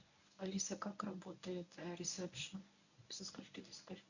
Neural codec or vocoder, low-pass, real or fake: codec, 24 kHz, 0.9 kbps, WavTokenizer, medium speech release version 1; 7.2 kHz; fake